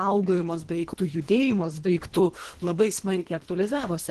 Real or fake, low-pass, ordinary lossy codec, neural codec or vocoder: fake; 10.8 kHz; Opus, 16 kbps; codec, 24 kHz, 1.5 kbps, HILCodec